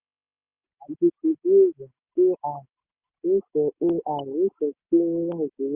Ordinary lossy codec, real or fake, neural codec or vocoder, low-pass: Opus, 64 kbps; real; none; 3.6 kHz